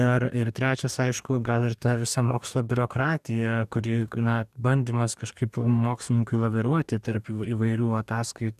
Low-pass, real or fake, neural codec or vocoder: 14.4 kHz; fake; codec, 44.1 kHz, 2.6 kbps, DAC